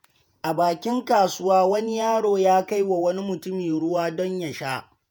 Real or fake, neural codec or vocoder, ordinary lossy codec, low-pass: fake; vocoder, 48 kHz, 128 mel bands, Vocos; none; none